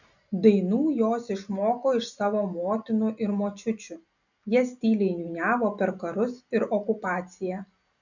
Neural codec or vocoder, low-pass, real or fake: none; 7.2 kHz; real